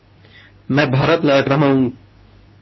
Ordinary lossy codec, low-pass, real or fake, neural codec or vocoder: MP3, 24 kbps; 7.2 kHz; fake; codec, 24 kHz, 0.9 kbps, WavTokenizer, medium speech release version 2